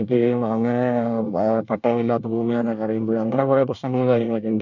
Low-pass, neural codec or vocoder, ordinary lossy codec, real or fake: 7.2 kHz; codec, 24 kHz, 1 kbps, SNAC; none; fake